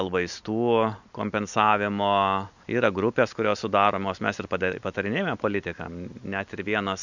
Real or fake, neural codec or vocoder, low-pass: real; none; 7.2 kHz